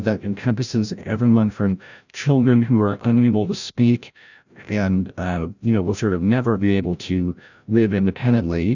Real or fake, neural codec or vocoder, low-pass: fake; codec, 16 kHz, 0.5 kbps, FreqCodec, larger model; 7.2 kHz